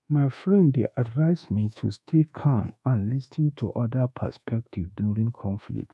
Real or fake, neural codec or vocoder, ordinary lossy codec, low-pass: fake; codec, 24 kHz, 1.2 kbps, DualCodec; none; none